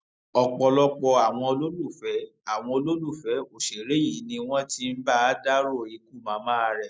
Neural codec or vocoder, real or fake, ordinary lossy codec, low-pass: none; real; none; none